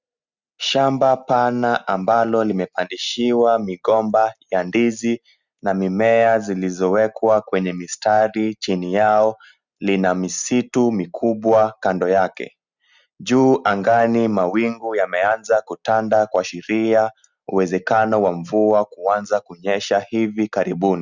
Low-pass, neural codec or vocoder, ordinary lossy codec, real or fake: 7.2 kHz; none; Opus, 64 kbps; real